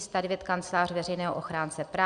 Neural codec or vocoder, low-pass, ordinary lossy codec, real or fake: none; 9.9 kHz; Opus, 64 kbps; real